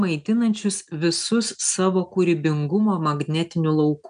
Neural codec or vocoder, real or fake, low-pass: none; real; 9.9 kHz